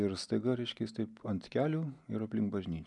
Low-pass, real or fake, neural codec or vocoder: 10.8 kHz; real; none